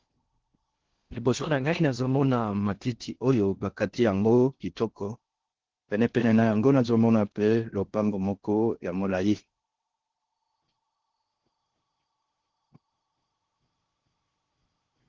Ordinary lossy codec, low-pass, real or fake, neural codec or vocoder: Opus, 16 kbps; 7.2 kHz; fake; codec, 16 kHz in and 24 kHz out, 0.6 kbps, FocalCodec, streaming, 4096 codes